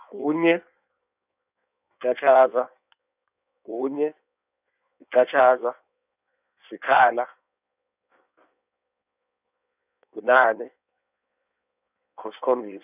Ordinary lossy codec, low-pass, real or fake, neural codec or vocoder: none; 3.6 kHz; fake; codec, 16 kHz in and 24 kHz out, 1.1 kbps, FireRedTTS-2 codec